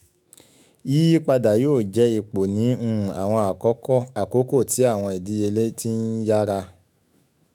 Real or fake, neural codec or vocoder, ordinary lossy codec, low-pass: fake; autoencoder, 48 kHz, 128 numbers a frame, DAC-VAE, trained on Japanese speech; none; 19.8 kHz